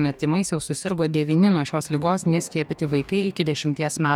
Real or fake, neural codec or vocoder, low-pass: fake; codec, 44.1 kHz, 2.6 kbps, DAC; 19.8 kHz